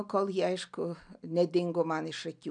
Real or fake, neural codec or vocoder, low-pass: real; none; 9.9 kHz